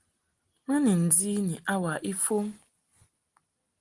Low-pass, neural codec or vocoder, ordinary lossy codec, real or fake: 10.8 kHz; none; Opus, 24 kbps; real